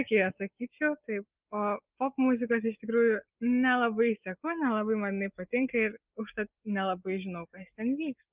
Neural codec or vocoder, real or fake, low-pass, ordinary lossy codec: none; real; 3.6 kHz; Opus, 32 kbps